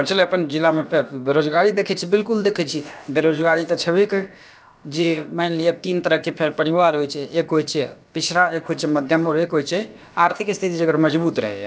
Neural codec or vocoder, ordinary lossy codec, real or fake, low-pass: codec, 16 kHz, about 1 kbps, DyCAST, with the encoder's durations; none; fake; none